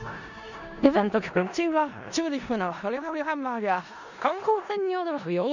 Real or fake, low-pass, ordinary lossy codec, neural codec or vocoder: fake; 7.2 kHz; none; codec, 16 kHz in and 24 kHz out, 0.4 kbps, LongCat-Audio-Codec, four codebook decoder